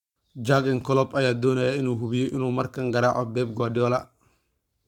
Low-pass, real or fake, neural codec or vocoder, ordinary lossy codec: 19.8 kHz; fake; codec, 44.1 kHz, 7.8 kbps, Pupu-Codec; MP3, 96 kbps